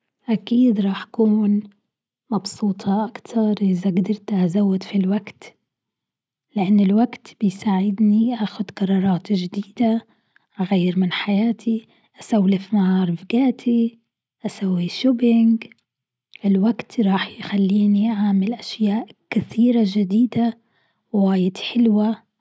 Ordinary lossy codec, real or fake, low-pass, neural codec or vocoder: none; real; none; none